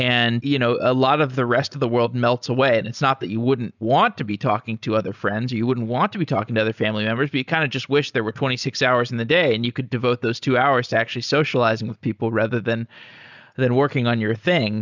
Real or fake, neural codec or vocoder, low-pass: real; none; 7.2 kHz